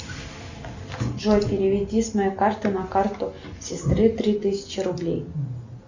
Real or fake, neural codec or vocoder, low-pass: real; none; 7.2 kHz